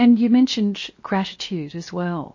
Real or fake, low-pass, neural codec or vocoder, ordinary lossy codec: fake; 7.2 kHz; codec, 16 kHz, 0.7 kbps, FocalCodec; MP3, 32 kbps